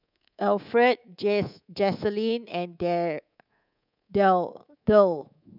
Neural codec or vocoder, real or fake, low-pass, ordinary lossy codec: codec, 24 kHz, 3.1 kbps, DualCodec; fake; 5.4 kHz; none